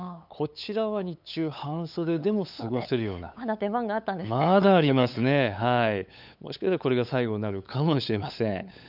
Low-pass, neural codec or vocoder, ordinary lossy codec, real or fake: 5.4 kHz; codec, 16 kHz, 8 kbps, FunCodec, trained on LibriTTS, 25 frames a second; none; fake